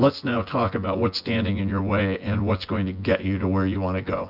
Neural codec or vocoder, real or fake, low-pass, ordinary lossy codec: vocoder, 24 kHz, 100 mel bands, Vocos; fake; 5.4 kHz; Opus, 64 kbps